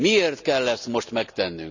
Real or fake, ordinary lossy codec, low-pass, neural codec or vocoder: real; none; 7.2 kHz; none